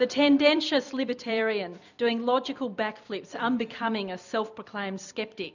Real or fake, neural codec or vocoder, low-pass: fake; vocoder, 44.1 kHz, 128 mel bands every 256 samples, BigVGAN v2; 7.2 kHz